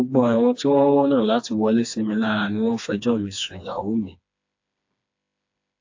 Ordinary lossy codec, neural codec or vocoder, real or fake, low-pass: AAC, 48 kbps; codec, 16 kHz, 2 kbps, FreqCodec, smaller model; fake; 7.2 kHz